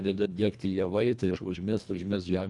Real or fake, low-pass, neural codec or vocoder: fake; 10.8 kHz; codec, 24 kHz, 1.5 kbps, HILCodec